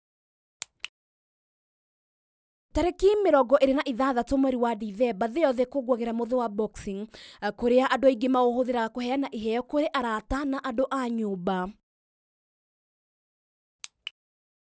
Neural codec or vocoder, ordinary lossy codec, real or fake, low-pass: none; none; real; none